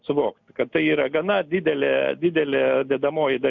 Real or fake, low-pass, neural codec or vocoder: real; 7.2 kHz; none